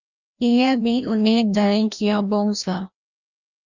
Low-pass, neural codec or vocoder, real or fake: 7.2 kHz; codec, 16 kHz, 1 kbps, FreqCodec, larger model; fake